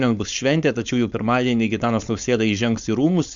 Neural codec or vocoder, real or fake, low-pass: codec, 16 kHz, 4.8 kbps, FACodec; fake; 7.2 kHz